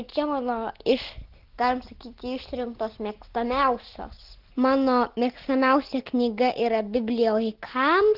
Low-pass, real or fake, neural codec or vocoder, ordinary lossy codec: 5.4 kHz; real; none; Opus, 16 kbps